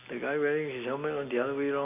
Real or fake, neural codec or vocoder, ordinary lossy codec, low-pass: real; none; AAC, 32 kbps; 3.6 kHz